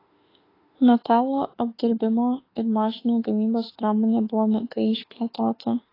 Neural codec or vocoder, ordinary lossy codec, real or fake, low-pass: autoencoder, 48 kHz, 32 numbers a frame, DAC-VAE, trained on Japanese speech; AAC, 24 kbps; fake; 5.4 kHz